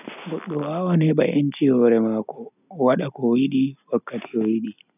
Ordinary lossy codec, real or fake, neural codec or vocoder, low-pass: none; real; none; 3.6 kHz